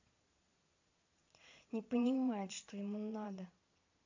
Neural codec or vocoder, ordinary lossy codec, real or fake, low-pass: vocoder, 22.05 kHz, 80 mel bands, Vocos; AAC, 48 kbps; fake; 7.2 kHz